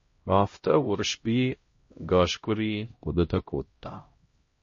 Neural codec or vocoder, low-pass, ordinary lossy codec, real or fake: codec, 16 kHz, 0.5 kbps, X-Codec, HuBERT features, trained on LibriSpeech; 7.2 kHz; MP3, 32 kbps; fake